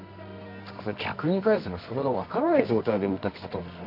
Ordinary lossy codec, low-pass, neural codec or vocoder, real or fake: none; 5.4 kHz; codec, 24 kHz, 0.9 kbps, WavTokenizer, medium music audio release; fake